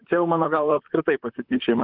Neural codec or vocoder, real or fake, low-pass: vocoder, 44.1 kHz, 128 mel bands, Pupu-Vocoder; fake; 5.4 kHz